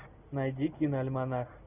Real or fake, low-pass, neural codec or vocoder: real; 3.6 kHz; none